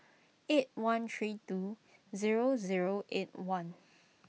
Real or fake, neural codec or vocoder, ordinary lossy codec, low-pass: real; none; none; none